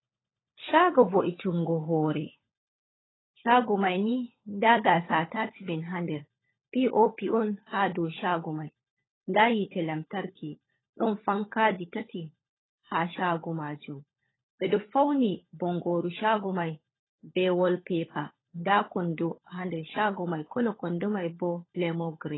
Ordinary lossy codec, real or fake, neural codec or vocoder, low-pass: AAC, 16 kbps; fake; codec, 16 kHz, 16 kbps, FunCodec, trained on LibriTTS, 50 frames a second; 7.2 kHz